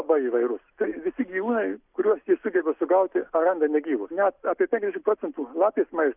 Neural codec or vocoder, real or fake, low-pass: none; real; 3.6 kHz